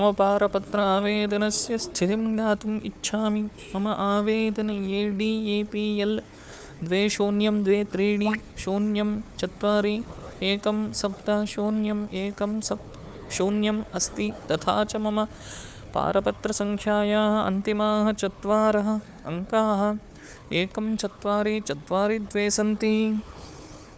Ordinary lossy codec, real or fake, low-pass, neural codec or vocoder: none; fake; none; codec, 16 kHz, 4 kbps, FunCodec, trained on Chinese and English, 50 frames a second